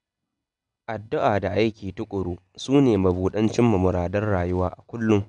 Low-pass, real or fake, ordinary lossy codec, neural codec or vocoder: 10.8 kHz; real; none; none